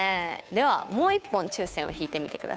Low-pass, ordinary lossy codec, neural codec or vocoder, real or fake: none; none; codec, 16 kHz, 2 kbps, FunCodec, trained on Chinese and English, 25 frames a second; fake